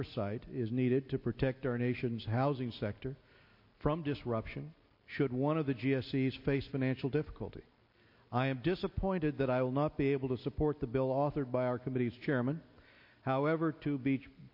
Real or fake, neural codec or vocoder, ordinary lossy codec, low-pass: real; none; MP3, 32 kbps; 5.4 kHz